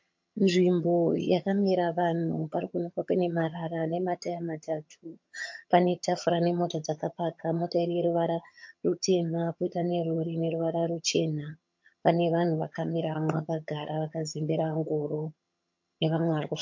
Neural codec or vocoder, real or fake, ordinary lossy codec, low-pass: vocoder, 22.05 kHz, 80 mel bands, HiFi-GAN; fake; MP3, 48 kbps; 7.2 kHz